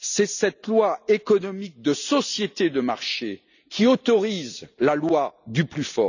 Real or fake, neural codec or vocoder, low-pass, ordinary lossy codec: real; none; 7.2 kHz; none